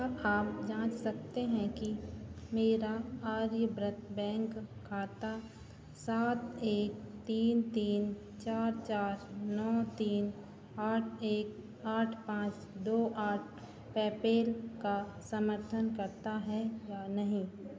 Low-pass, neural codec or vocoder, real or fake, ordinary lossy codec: none; none; real; none